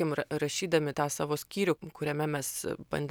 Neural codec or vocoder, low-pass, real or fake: none; 19.8 kHz; real